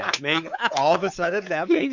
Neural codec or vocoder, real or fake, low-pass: codec, 16 kHz, 4 kbps, X-Codec, WavLM features, trained on Multilingual LibriSpeech; fake; 7.2 kHz